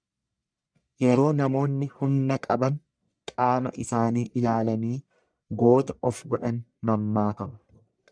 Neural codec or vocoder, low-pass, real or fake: codec, 44.1 kHz, 1.7 kbps, Pupu-Codec; 9.9 kHz; fake